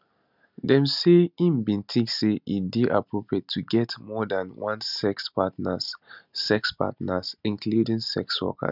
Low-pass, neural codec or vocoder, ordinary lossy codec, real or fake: 5.4 kHz; none; none; real